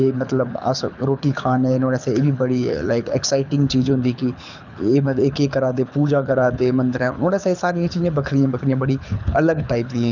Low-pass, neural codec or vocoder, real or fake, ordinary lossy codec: 7.2 kHz; codec, 24 kHz, 6 kbps, HILCodec; fake; none